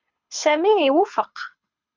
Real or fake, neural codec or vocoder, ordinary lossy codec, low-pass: fake; codec, 24 kHz, 6 kbps, HILCodec; MP3, 64 kbps; 7.2 kHz